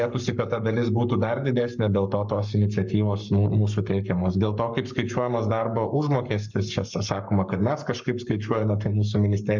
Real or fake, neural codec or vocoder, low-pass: fake; codec, 44.1 kHz, 7.8 kbps, Pupu-Codec; 7.2 kHz